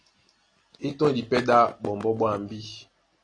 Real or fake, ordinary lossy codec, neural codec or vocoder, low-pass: real; AAC, 32 kbps; none; 9.9 kHz